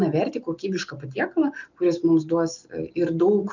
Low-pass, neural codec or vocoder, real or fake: 7.2 kHz; none; real